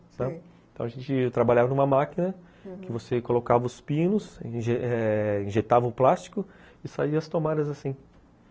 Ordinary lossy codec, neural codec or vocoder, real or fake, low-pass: none; none; real; none